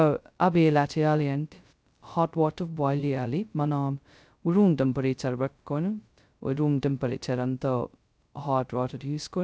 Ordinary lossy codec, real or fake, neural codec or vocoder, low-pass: none; fake; codec, 16 kHz, 0.2 kbps, FocalCodec; none